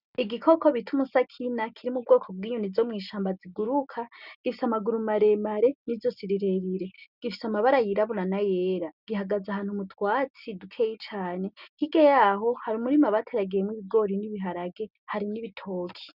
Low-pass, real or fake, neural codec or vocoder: 5.4 kHz; real; none